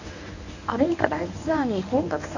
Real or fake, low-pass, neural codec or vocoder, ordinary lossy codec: fake; 7.2 kHz; codec, 24 kHz, 0.9 kbps, WavTokenizer, medium speech release version 1; none